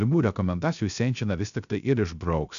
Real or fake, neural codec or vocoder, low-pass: fake; codec, 16 kHz, 0.3 kbps, FocalCodec; 7.2 kHz